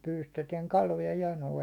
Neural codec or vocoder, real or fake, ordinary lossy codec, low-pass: autoencoder, 48 kHz, 128 numbers a frame, DAC-VAE, trained on Japanese speech; fake; none; 19.8 kHz